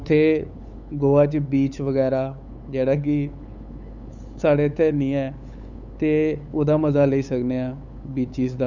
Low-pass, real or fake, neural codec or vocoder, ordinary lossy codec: 7.2 kHz; fake; codec, 16 kHz, 8 kbps, FunCodec, trained on LibriTTS, 25 frames a second; none